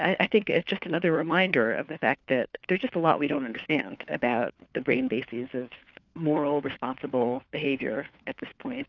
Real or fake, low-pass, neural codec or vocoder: fake; 7.2 kHz; codec, 16 kHz, 4 kbps, FunCodec, trained on LibriTTS, 50 frames a second